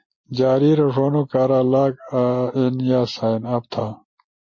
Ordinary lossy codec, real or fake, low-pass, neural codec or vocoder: MP3, 32 kbps; real; 7.2 kHz; none